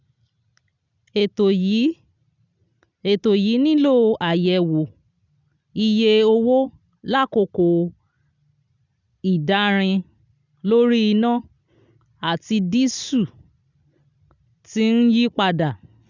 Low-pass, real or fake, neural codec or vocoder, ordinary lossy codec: 7.2 kHz; real; none; none